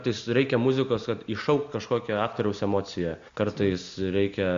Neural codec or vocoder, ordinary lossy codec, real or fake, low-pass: none; MP3, 64 kbps; real; 7.2 kHz